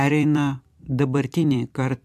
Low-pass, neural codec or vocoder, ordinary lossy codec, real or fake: 14.4 kHz; vocoder, 44.1 kHz, 128 mel bands every 256 samples, BigVGAN v2; AAC, 96 kbps; fake